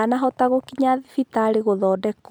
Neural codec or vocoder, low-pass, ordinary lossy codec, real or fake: none; none; none; real